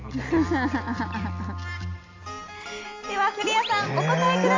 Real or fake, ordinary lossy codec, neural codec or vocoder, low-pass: real; AAC, 32 kbps; none; 7.2 kHz